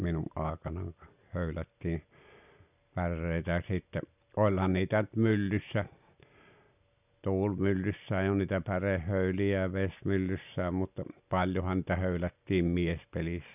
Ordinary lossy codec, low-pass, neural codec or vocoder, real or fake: none; 3.6 kHz; none; real